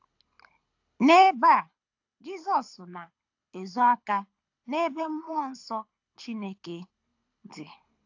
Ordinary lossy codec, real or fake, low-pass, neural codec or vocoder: AAC, 48 kbps; fake; 7.2 kHz; codec, 24 kHz, 6 kbps, HILCodec